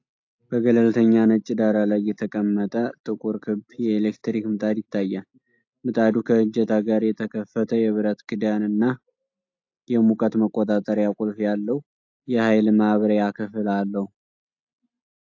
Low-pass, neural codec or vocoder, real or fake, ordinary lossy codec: 7.2 kHz; none; real; AAC, 48 kbps